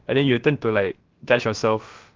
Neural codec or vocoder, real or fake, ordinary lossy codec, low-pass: codec, 16 kHz, about 1 kbps, DyCAST, with the encoder's durations; fake; Opus, 32 kbps; 7.2 kHz